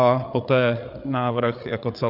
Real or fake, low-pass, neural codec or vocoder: fake; 5.4 kHz; codec, 16 kHz, 8 kbps, FreqCodec, larger model